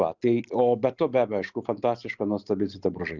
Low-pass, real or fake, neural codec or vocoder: 7.2 kHz; real; none